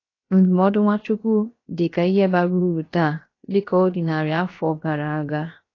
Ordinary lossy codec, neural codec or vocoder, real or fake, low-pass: AAC, 32 kbps; codec, 16 kHz, 0.7 kbps, FocalCodec; fake; 7.2 kHz